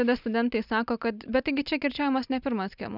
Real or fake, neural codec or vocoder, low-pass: real; none; 5.4 kHz